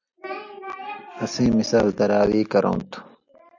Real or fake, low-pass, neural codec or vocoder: real; 7.2 kHz; none